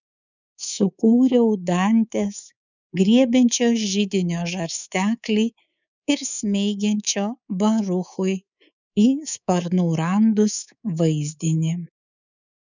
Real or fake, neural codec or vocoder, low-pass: fake; codec, 24 kHz, 3.1 kbps, DualCodec; 7.2 kHz